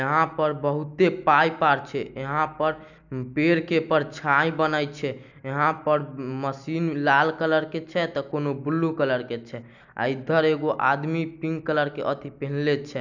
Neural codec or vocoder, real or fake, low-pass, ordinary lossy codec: none; real; 7.2 kHz; none